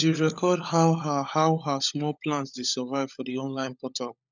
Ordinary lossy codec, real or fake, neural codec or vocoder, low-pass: none; fake; codec, 16 kHz, 16 kbps, FunCodec, trained on LibriTTS, 50 frames a second; 7.2 kHz